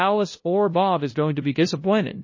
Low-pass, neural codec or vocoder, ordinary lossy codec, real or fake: 7.2 kHz; codec, 16 kHz, 0.5 kbps, FunCodec, trained on LibriTTS, 25 frames a second; MP3, 32 kbps; fake